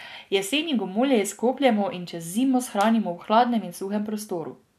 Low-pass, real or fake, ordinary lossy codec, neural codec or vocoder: 14.4 kHz; real; none; none